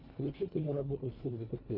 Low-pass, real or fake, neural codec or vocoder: 5.4 kHz; fake; codec, 24 kHz, 1.5 kbps, HILCodec